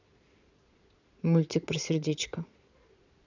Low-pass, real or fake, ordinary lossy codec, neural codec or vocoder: 7.2 kHz; fake; none; vocoder, 22.05 kHz, 80 mel bands, Vocos